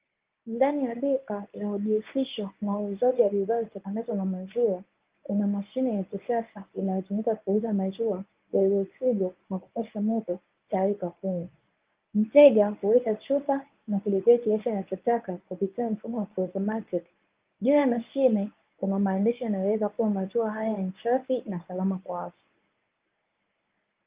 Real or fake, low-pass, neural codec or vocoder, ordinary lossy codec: fake; 3.6 kHz; codec, 24 kHz, 0.9 kbps, WavTokenizer, medium speech release version 1; Opus, 32 kbps